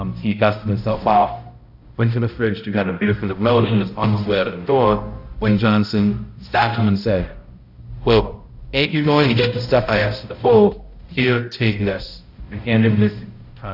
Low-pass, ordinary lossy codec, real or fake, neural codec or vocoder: 5.4 kHz; AAC, 48 kbps; fake; codec, 16 kHz, 0.5 kbps, X-Codec, HuBERT features, trained on balanced general audio